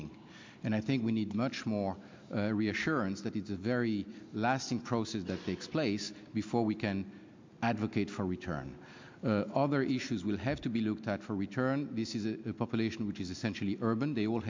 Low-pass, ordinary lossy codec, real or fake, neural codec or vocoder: 7.2 kHz; MP3, 64 kbps; real; none